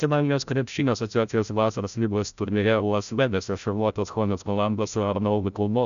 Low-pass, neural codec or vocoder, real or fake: 7.2 kHz; codec, 16 kHz, 0.5 kbps, FreqCodec, larger model; fake